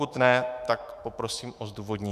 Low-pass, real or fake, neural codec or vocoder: 14.4 kHz; fake; autoencoder, 48 kHz, 128 numbers a frame, DAC-VAE, trained on Japanese speech